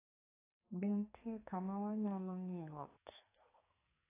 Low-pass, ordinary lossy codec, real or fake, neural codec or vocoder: 3.6 kHz; AAC, 16 kbps; fake; codec, 44.1 kHz, 2.6 kbps, SNAC